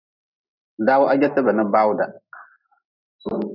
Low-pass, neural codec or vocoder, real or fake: 5.4 kHz; none; real